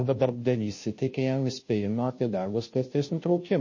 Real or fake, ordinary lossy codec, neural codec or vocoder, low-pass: fake; MP3, 32 kbps; codec, 16 kHz, 0.5 kbps, FunCodec, trained on Chinese and English, 25 frames a second; 7.2 kHz